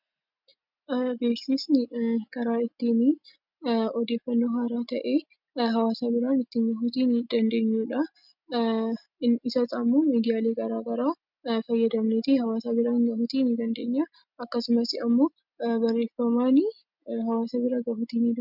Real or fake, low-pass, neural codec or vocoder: real; 5.4 kHz; none